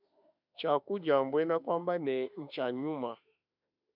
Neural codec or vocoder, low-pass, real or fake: autoencoder, 48 kHz, 32 numbers a frame, DAC-VAE, trained on Japanese speech; 5.4 kHz; fake